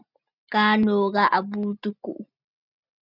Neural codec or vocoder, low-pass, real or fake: none; 5.4 kHz; real